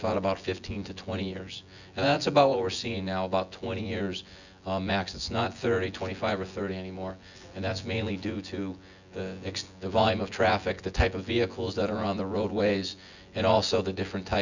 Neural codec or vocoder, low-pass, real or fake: vocoder, 24 kHz, 100 mel bands, Vocos; 7.2 kHz; fake